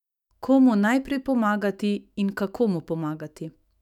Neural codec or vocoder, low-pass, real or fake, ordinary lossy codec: autoencoder, 48 kHz, 128 numbers a frame, DAC-VAE, trained on Japanese speech; 19.8 kHz; fake; none